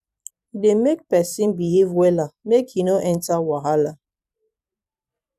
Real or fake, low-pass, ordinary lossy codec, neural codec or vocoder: fake; 14.4 kHz; none; vocoder, 44.1 kHz, 128 mel bands every 256 samples, BigVGAN v2